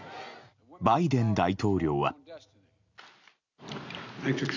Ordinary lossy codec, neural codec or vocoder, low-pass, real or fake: none; none; 7.2 kHz; real